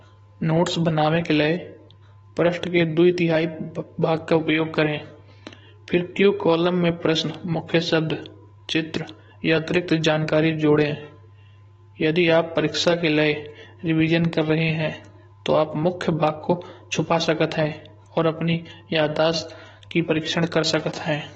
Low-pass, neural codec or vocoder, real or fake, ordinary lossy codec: 19.8 kHz; codec, 44.1 kHz, 7.8 kbps, DAC; fake; AAC, 24 kbps